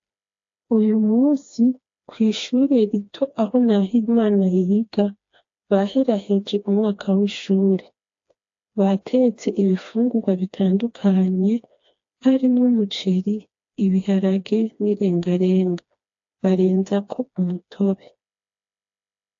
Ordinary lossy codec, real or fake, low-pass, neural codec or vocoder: AAC, 48 kbps; fake; 7.2 kHz; codec, 16 kHz, 2 kbps, FreqCodec, smaller model